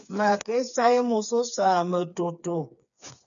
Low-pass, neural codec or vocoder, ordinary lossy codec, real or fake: 7.2 kHz; codec, 16 kHz, 4 kbps, FreqCodec, smaller model; MP3, 96 kbps; fake